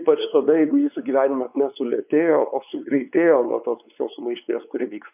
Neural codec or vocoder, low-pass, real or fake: codec, 16 kHz, 4 kbps, X-Codec, WavLM features, trained on Multilingual LibriSpeech; 3.6 kHz; fake